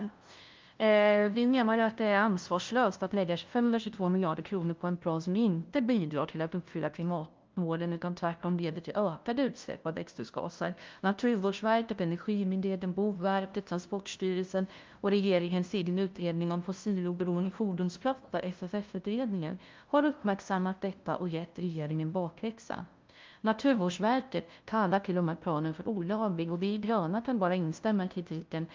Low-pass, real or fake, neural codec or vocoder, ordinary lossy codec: 7.2 kHz; fake; codec, 16 kHz, 0.5 kbps, FunCodec, trained on LibriTTS, 25 frames a second; Opus, 32 kbps